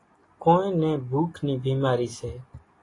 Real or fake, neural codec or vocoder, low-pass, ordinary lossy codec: real; none; 10.8 kHz; AAC, 32 kbps